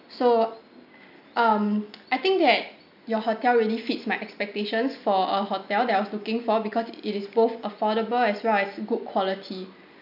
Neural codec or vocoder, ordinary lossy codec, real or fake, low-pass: none; none; real; 5.4 kHz